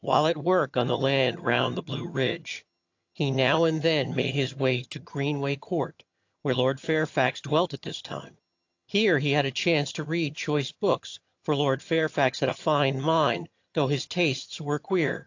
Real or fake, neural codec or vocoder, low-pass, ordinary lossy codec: fake; vocoder, 22.05 kHz, 80 mel bands, HiFi-GAN; 7.2 kHz; AAC, 48 kbps